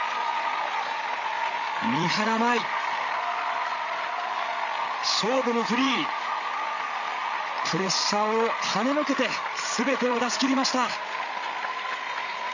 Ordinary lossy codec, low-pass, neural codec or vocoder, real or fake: none; 7.2 kHz; codec, 16 kHz, 8 kbps, FreqCodec, larger model; fake